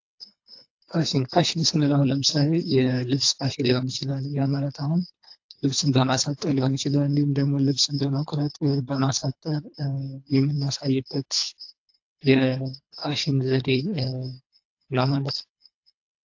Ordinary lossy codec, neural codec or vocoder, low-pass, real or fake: AAC, 48 kbps; codec, 24 kHz, 3 kbps, HILCodec; 7.2 kHz; fake